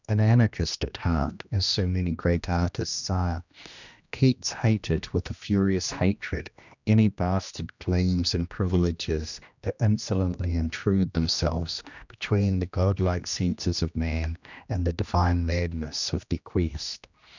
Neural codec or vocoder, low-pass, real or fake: codec, 16 kHz, 1 kbps, X-Codec, HuBERT features, trained on general audio; 7.2 kHz; fake